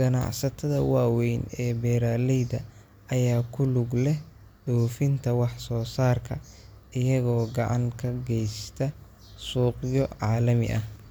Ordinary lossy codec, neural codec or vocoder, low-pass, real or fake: none; none; none; real